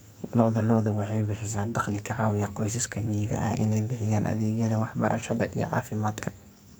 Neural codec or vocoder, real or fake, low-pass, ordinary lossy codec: codec, 44.1 kHz, 2.6 kbps, SNAC; fake; none; none